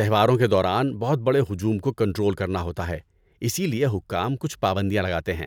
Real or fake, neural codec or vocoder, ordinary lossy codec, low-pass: real; none; none; 19.8 kHz